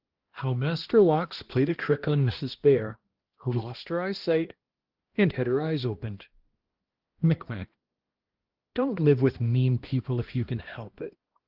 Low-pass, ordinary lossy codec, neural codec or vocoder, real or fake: 5.4 kHz; Opus, 16 kbps; codec, 16 kHz, 1 kbps, X-Codec, HuBERT features, trained on balanced general audio; fake